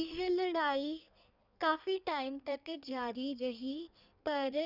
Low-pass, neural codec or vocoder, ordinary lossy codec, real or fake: 5.4 kHz; codec, 16 kHz in and 24 kHz out, 1.1 kbps, FireRedTTS-2 codec; none; fake